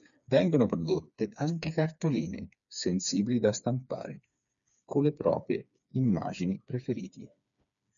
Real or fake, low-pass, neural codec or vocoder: fake; 7.2 kHz; codec, 16 kHz, 4 kbps, FreqCodec, smaller model